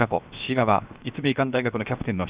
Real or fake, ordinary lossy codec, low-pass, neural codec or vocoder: fake; Opus, 64 kbps; 3.6 kHz; codec, 16 kHz, 0.7 kbps, FocalCodec